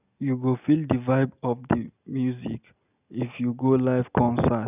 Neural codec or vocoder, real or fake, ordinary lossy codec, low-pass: none; real; none; 3.6 kHz